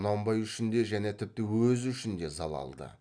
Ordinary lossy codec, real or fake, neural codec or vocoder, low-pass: none; real; none; 9.9 kHz